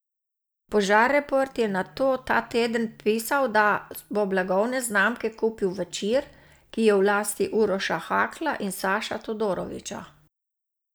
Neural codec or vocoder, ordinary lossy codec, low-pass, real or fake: none; none; none; real